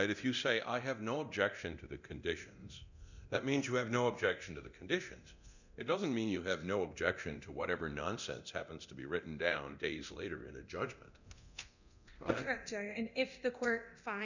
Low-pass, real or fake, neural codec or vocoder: 7.2 kHz; fake; codec, 24 kHz, 0.9 kbps, DualCodec